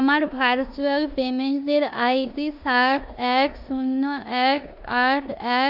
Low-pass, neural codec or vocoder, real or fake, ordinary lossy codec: 5.4 kHz; codec, 16 kHz in and 24 kHz out, 0.9 kbps, LongCat-Audio-Codec, four codebook decoder; fake; AAC, 48 kbps